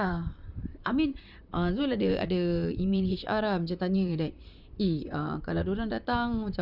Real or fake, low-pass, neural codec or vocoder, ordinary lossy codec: fake; 5.4 kHz; vocoder, 44.1 kHz, 128 mel bands, Pupu-Vocoder; none